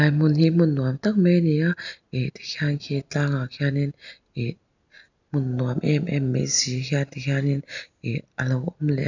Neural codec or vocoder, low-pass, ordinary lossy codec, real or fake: none; 7.2 kHz; none; real